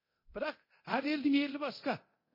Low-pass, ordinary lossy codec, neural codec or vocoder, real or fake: 5.4 kHz; MP3, 24 kbps; codec, 24 kHz, 0.9 kbps, DualCodec; fake